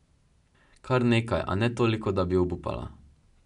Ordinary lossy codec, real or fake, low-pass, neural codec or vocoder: none; real; 10.8 kHz; none